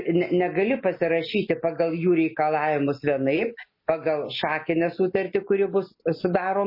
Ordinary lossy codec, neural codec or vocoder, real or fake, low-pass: MP3, 24 kbps; none; real; 5.4 kHz